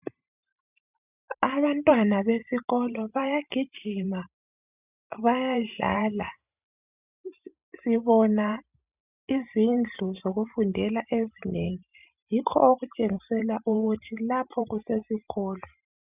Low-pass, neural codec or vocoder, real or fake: 3.6 kHz; vocoder, 44.1 kHz, 128 mel bands every 512 samples, BigVGAN v2; fake